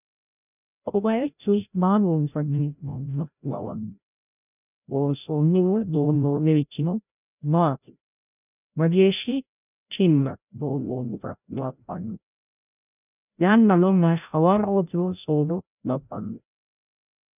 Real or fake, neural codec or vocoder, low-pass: fake; codec, 16 kHz, 0.5 kbps, FreqCodec, larger model; 3.6 kHz